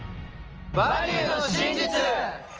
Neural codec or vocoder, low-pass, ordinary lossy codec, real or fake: none; 7.2 kHz; Opus, 24 kbps; real